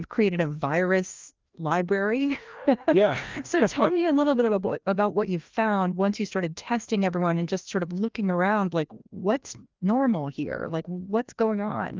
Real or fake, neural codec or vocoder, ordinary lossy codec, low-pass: fake; codec, 16 kHz, 1 kbps, FreqCodec, larger model; Opus, 32 kbps; 7.2 kHz